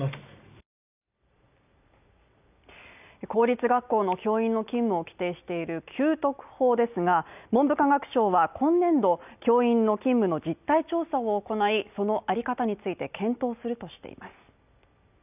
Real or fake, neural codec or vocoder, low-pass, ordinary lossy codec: real; none; 3.6 kHz; none